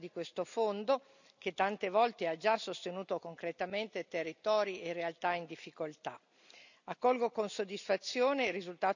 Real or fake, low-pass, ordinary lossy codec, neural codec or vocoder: real; 7.2 kHz; none; none